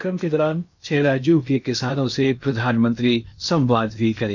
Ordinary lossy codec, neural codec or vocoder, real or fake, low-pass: none; codec, 16 kHz in and 24 kHz out, 0.8 kbps, FocalCodec, streaming, 65536 codes; fake; 7.2 kHz